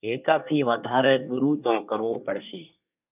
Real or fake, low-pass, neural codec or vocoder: fake; 3.6 kHz; codec, 24 kHz, 1 kbps, SNAC